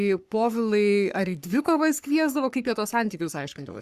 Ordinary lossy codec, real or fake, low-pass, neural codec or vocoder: Opus, 64 kbps; fake; 14.4 kHz; codec, 44.1 kHz, 3.4 kbps, Pupu-Codec